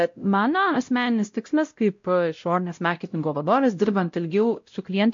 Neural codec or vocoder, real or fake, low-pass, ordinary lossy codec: codec, 16 kHz, 0.5 kbps, X-Codec, WavLM features, trained on Multilingual LibriSpeech; fake; 7.2 kHz; MP3, 48 kbps